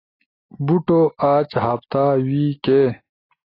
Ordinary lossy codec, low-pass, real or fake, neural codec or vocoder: AAC, 24 kbps; 5.4 kHz; real; none